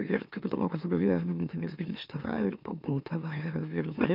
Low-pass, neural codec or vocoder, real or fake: 5.4 kHz; autoencoder, 44.1 kHz, a latent of 192 numbers a frame, MeloTTS; fake